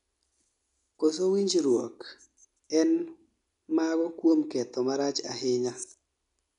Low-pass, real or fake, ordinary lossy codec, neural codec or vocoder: 10.8 kHz; real; none; none